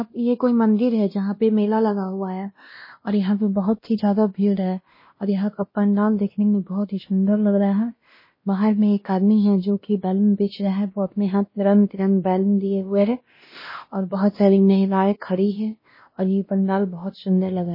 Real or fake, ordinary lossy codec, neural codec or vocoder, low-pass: fake; MP3, 24 kbps; codec, 16 kHz, 1 kbps, X-Codec, WavLM features, trained on Multilingual LibriSpeech; 5.4 kHz